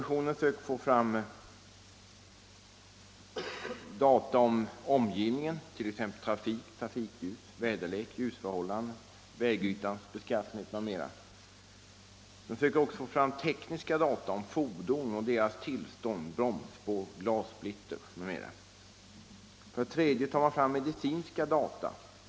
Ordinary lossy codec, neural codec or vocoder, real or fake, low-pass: none; none; real; none